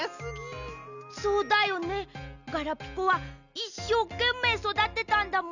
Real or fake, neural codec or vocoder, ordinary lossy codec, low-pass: real; none; none; 7.2 kHz